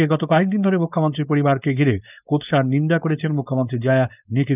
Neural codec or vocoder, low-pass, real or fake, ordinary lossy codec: codec, 16 kHz, 4.8 kbps, FACodec; 3.6 kHz; fake; none